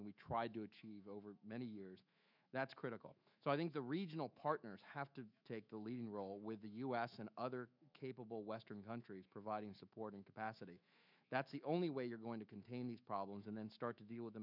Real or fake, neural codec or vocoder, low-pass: fake; autoencoder, 48 kHz, 128 numbers a frame, DAC-VAE, trained on Japanese speech; 5.4 kHz